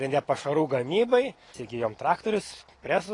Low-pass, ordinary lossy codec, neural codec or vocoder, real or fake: 10.8 kHz; AAC, 32 kbps; none; real